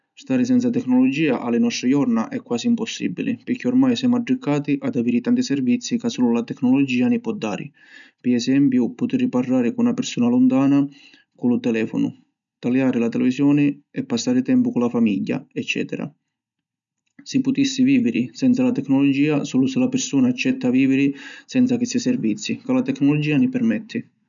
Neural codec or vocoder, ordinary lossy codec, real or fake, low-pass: none; none; real; 7.2 kHz